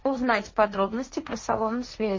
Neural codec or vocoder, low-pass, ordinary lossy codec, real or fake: codec, 16 kHz, 1.1 kbps, Voila-Tokenizer; 7.2 kHz; MP3, 32 kbps; fake